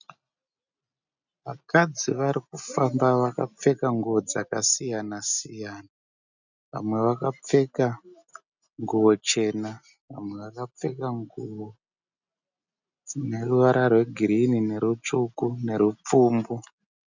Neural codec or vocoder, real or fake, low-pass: none; real; 7.2 kHz